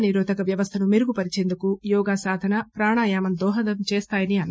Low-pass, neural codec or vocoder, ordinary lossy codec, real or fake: none; none; none; real